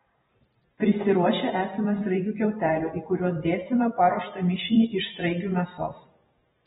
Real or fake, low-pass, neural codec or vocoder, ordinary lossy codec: fake; 19.8 kHz; vocoder, 44.1 kHz, 128 mel bands every 512 samples, BigVGAN v2; AAC, 16 kbps